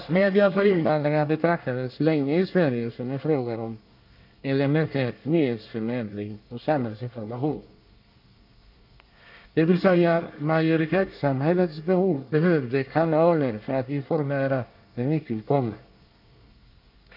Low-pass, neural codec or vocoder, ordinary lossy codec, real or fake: 5.4 kHz; codec, 24 kHz, 1 kbps, SNAC; none; fake